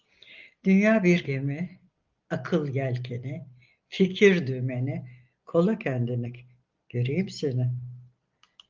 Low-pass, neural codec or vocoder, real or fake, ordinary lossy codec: 7.2 kHz; none; real; Opus, 32 kbps